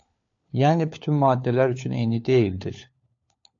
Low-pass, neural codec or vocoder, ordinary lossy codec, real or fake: 7.2 kHz; codec, 16 kHz, 4 kbps, FunCodec, trained on LibriTTS, 50 frames a second; AAC, 48 kbps; fake